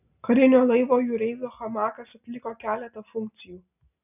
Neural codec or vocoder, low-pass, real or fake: none; 3.6 kHz; real